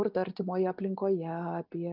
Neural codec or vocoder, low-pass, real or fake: none; 5.4 kHz; real